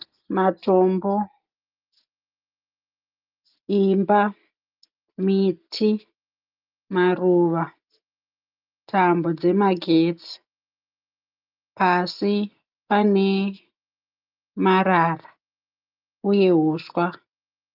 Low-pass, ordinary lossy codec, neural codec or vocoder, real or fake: 5.4 kHz; Opus, 24 kbps; none; real